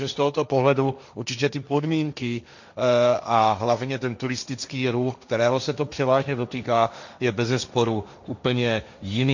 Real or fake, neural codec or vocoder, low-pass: fake; codec, 16 kHz, 1.1 kbps, Voila-Tokenizer; 7.2 kHz